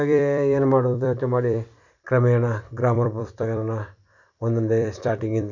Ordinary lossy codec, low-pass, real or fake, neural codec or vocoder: none; 7.2 kHz; fake; vocoder, 44.1 kHz, 128 mel bands every 256 samples, BigVGAN v2